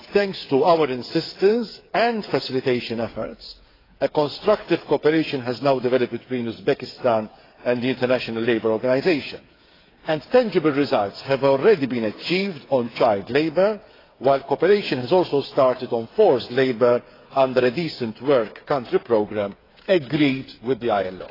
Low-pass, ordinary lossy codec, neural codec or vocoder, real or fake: 5.4 kHz; AAC, 24 kbps; codec, 16 kHz, 8 kbps, FreqCodec, smaller model; fake